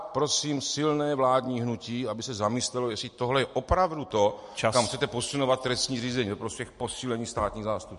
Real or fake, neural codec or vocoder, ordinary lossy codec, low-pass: real; none; MP3, 48 kbps; 14.4 kHz